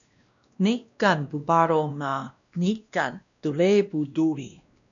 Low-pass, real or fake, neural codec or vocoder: 7.2 kHz; fake; codec, 16 kHz, 1 kbps, X-Codec, WavLM features, trained on Multilingual LibriSpeech